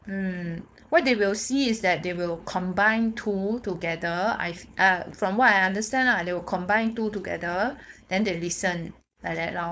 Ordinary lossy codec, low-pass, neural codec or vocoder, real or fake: none; none; codec, 16 kHz, 4.8 kbps, FACodec; fake